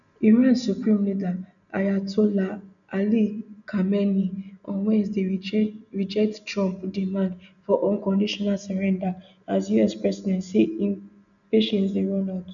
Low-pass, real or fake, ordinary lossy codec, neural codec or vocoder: 7.2 kHz; real; none; none